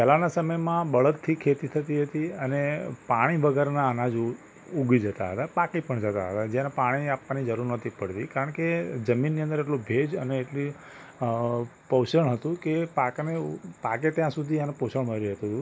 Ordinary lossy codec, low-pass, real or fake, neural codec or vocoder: none; none; real; none